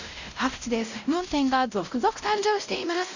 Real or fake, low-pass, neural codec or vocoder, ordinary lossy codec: fake; 7.2 kHz; codec, 16 kHz, 0.5 kbps, X-Codec, WavLM features, trained on Multilingual LibriSpeech; none